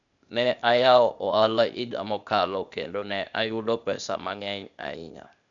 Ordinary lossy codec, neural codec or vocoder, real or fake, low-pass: none; codec, 16 kHz, 0.8 kbps, ZipCodec; fake; 7.2 kHz